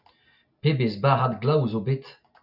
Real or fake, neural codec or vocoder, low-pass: real; none; 5.4 kHz